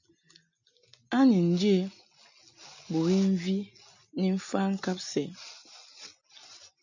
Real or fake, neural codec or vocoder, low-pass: real; none; 7.2 kHz